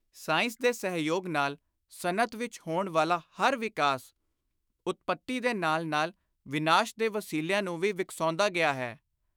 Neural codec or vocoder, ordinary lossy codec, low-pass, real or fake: autoencoder, 48 kHz, 128 numbers a frame, DAC-VAE, trained on Japanese speech; none; none; fake